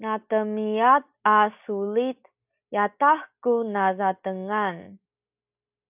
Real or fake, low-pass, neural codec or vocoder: real; 3.6 kHz; none